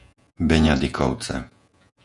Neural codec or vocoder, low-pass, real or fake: vocoder, 48 kHz, 128 mel bands, Vocos; 10.8 kHz; fake